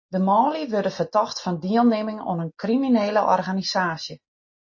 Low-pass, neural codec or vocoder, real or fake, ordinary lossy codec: 7.2 kHz; none; real; MP3, 32 kbps